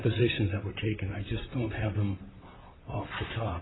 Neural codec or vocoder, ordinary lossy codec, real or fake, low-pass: vocoder, 44.1 kHz, 128 mel bands, Pupu-Vocoder; AAC, 16 kbps; fake; 7.2 kHz